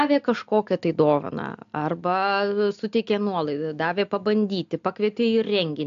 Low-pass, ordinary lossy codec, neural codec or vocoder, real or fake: 7.2 kHz; AAC, 64 kbps; none; real